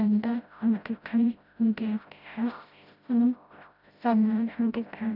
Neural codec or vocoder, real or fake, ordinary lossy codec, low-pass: codec, 16 kHz, 0.5 kbps, FreqCodec, smaller model; fake; none; 5.4 kHz